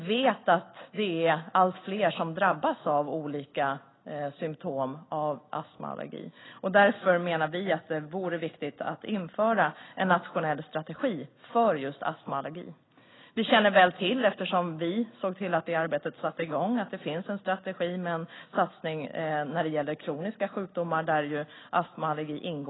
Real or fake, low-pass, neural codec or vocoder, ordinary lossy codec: real; 7.2 kHz; none; AAC, 16 kbps